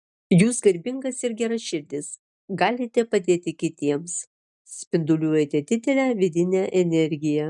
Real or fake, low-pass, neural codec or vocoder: real; 10.8 kHz; none